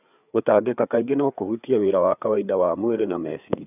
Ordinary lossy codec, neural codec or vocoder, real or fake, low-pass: none; codec, 16 kHz, 4 kbps, FreqCodec, larger model; fake; 3.6 kHz